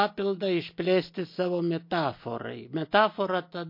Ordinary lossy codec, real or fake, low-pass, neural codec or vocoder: MP3, 32 kbps; real; 5.4 kHz; none